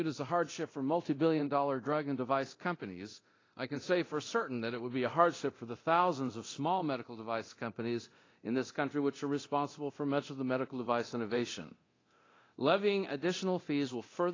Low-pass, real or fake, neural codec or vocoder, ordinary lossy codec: 7.2 kHz; fake; codec, 24 kHz, 0.9 kbps, DualCodec; AAC, 32 kbps